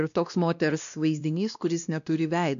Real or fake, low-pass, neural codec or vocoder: fake; 7.2 kHz; codec, 16 kHz, 1 kbps, X-Codec, WavLM features, trained on Multilingual LibriSpeech